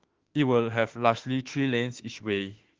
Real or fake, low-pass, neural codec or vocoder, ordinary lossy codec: fake; 7.2 kHz; autoencoder, 48 kHz, 32 numbers a frame, DAC-VAE, trained on Japanese speech; Opus, 16 kbps